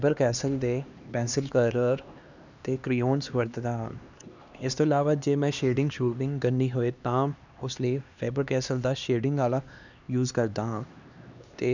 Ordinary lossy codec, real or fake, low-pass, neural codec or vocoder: none; fake; 7.2 kHz; codec, 16 kHz, 2 kbps, X-Codec, HuBERT features, trained on LibriSpeech